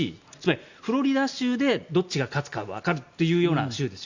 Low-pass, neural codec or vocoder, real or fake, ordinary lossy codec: 7.2 kHz; none; real; Opus, 64 kbps